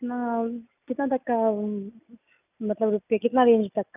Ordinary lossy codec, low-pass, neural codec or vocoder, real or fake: none; 3.6 kHz; none; real